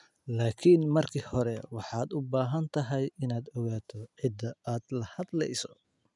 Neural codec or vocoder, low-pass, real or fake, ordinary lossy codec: none; 10.8 kHz; real; none